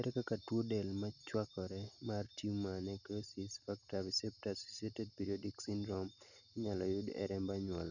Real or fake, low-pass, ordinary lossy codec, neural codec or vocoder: real; 7.2 kHz; none; none